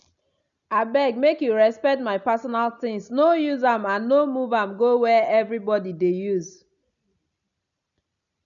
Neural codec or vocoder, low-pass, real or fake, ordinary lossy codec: none; 7.2 kHz; real; none